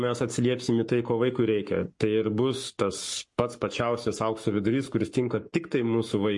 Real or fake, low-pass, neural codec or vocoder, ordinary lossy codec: fake; 10.8 kHz; codec, 44.1 kHz, 7.8 kbps, Pupu-Codec; MP3, 48 kbps